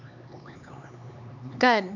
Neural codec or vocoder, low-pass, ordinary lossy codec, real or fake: codec, 16 kHz, 4 kbps, X-Codec, HuBERT features, trained on LibriSpeech; 7.2 kHz; none; fake